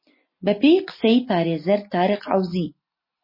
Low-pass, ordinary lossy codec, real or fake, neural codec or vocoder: 5.4 kHz; MP3, 24 kbps; real; none